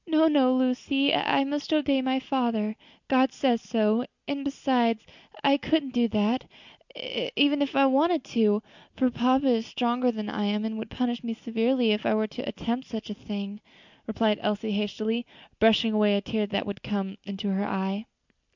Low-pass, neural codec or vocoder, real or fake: 7.2 kHz; none; real